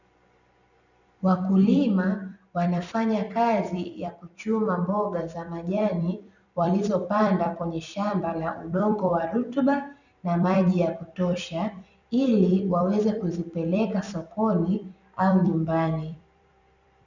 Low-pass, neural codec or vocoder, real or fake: 7.2 kHz; vocoder, 44.1 kHz, 128 mel bands every 256 samples, BigVGAN v2; fake